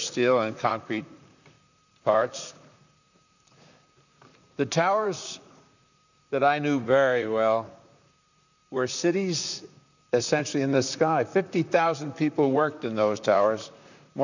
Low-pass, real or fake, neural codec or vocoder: 7.2 kHz; fake; vocoder, 44.1 kHz, 128 mel bands, Pupu-Vocoder